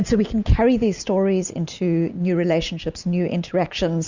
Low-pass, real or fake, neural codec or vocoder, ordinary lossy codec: 7.2 kHz; real; none; Opus, 64 kbps